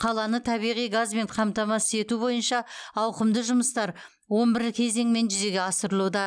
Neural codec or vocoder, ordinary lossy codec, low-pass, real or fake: none; none; 9.9 kHz; real